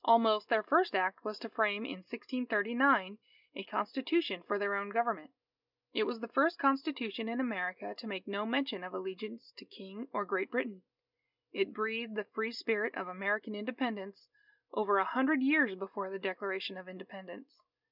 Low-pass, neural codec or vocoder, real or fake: 5.4 kHz; none; real